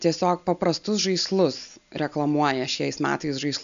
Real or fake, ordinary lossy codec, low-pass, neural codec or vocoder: real; AAC, 96 kbps; 7.2 kHz; none